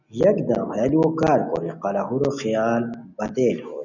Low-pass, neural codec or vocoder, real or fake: 7.2 kHz; none; real